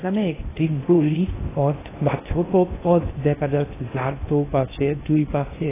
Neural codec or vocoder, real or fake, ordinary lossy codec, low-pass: codec, 16 kHz in and 24 kHz out, 0.6 kbps, FocalCodec, streaming, 4096 codes; fake; AAC, 16 kbps; 3.6 kHz